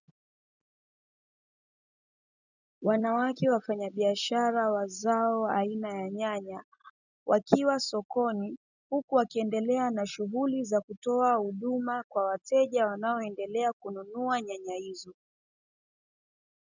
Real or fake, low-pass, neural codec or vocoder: real; 7.2 kHz; none